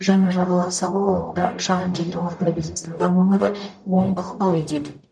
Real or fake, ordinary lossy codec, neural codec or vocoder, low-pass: fake; none; codec, 44.1 kHz, 0.9 kbps, DAC; 9.9 kHz